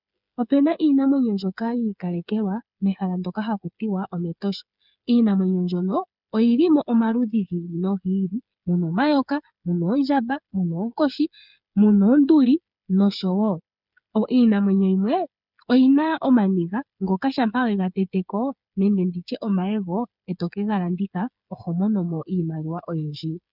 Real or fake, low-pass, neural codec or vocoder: fake; 5.4 kHz; codec, 16 kHz, 8 kbps, FreqCodec, smaller model